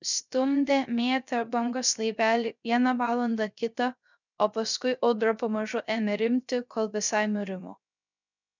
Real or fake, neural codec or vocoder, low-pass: fake; codec, 16 kHz, 0.3 kbps, FocalCodec; 7.2 kHz